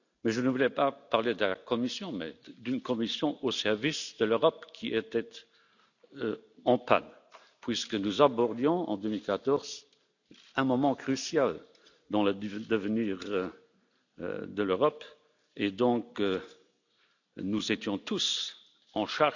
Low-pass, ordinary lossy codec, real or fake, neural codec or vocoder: 7.2 kHz; none; real; none